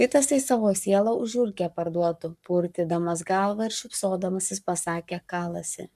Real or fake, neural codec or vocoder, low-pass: fake; codec, 44.1 kHz, 7.8 kbps, Pupu-Codec; 14.4 kHz